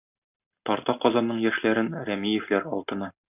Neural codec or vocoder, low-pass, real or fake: none; 3.6 kHz; real